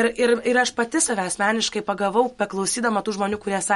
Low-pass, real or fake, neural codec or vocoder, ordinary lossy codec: 19.8 kHz; real; none; MP3, 48 kbps